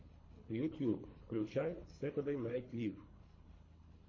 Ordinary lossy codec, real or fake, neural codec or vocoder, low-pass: MP3, 32 kbps; fake; codec, 24 kHz, 3 kbps, HILCodec; 7.2 kHz